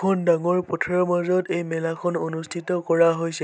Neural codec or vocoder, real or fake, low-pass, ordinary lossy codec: none; real; none; none